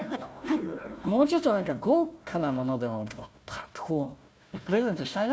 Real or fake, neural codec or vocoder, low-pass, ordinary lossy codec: fake; codec, 16 kHz, 1 kbps, FunCodec, trained on Chinese and English, 50 frames a second; none; none